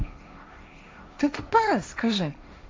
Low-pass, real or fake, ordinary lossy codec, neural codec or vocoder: none; fake; none; codec, 16 kHz, 1.1 kbps, Voila-Tokenizer